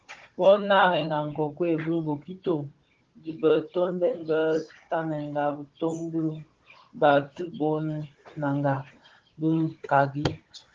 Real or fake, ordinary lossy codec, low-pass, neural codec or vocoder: fake; Opus, 16 kbps; 7.2 kHz; codec, 16 kHz, 16 kbps, FunCodec, trained on Chinese and English, 50 frames a second